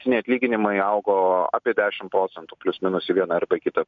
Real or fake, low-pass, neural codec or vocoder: real; 7.2 kHz; none